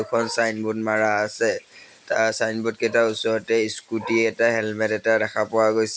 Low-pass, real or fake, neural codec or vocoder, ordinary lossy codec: none; real; none; none